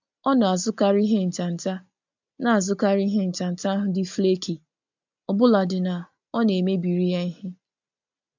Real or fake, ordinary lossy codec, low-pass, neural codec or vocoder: real; MP3, 64 kbps; 7.2 kHz; none